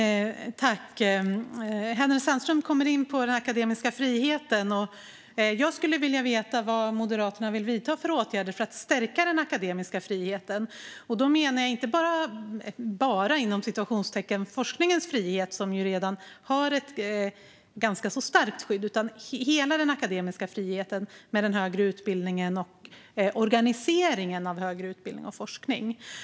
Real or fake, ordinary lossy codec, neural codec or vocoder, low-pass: real; none; none; none